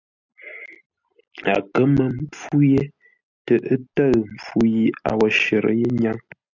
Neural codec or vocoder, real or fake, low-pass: none; real; 7.2 kHz